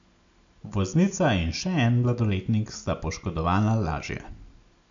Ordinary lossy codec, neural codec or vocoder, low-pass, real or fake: MP3, 64 kbps; none; 7.2 kHz; real